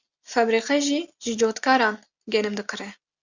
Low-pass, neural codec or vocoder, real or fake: 7.2 kHz; none; real